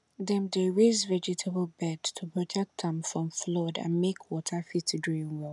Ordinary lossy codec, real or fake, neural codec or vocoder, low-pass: none; real; none; 10.8 kHz